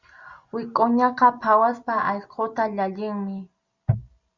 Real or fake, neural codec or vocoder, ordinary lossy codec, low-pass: real; none; Opus, 64 kbps; 7.2 kHz